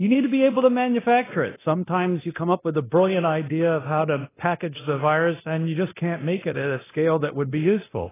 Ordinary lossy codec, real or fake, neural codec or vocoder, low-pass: AAC, 16 kbps; fake; codec, 24 kHz, 0.9 kbps, DualCodec; 3.6 kHz